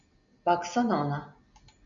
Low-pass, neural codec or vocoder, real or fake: 7.2 kHz; none; real